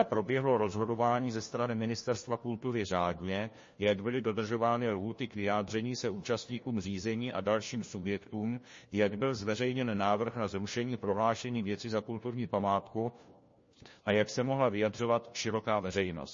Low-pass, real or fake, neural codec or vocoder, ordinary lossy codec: 7.2 kHz; fake; codec, 16 kHz, 1 kbps, FunCodec, trained on LibriTTS, 50 frames a second; MP3, 32 kbps